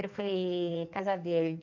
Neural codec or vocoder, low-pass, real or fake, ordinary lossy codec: codec, 16 kHz in and 24 kHz out, 1.1 kbps, FireRedTTS-2 codec; 7.2 kHz; fake; none